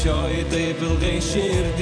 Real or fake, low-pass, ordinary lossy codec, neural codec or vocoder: fake; 9.9 kHz; MP3, 96 kbps; vocoder, 24 kHz, 100 mel bands, Vocos